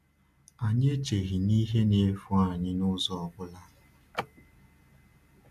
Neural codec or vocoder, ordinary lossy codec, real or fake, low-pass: none; none; real; 14.4 kHz